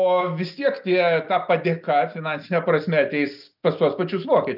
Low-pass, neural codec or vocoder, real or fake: 5.4 kHz; none; real